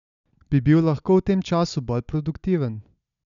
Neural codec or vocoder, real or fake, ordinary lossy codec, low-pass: none; real; none; 7.2 kHz